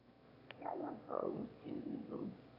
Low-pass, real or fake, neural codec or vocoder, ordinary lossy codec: 5.4 kHz; fake; autoencoder, 22.05 kHz, a latent of 192 numbers a frame, VITS, trained on one speaker; AAC, 24 kbps